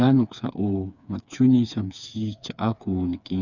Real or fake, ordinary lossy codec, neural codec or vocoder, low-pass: fake; none; codec, 16 kHz, 4 kbps, FreqCodec, smaller model; 7.2 kHz